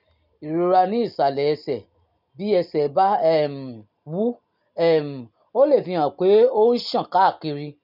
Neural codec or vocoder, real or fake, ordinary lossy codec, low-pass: none; real; none; 5.4 kHz